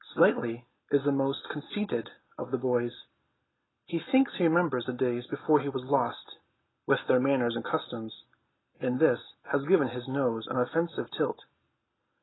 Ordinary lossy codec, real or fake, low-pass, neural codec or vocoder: AAC, 16 kbps; real; 7.2 kHz; none